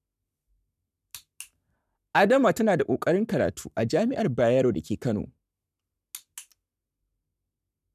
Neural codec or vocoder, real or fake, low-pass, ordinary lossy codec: codec, 44.1 kHz, 7.8 kbps, Pupu-Codec; fake; 14.4 kHz; none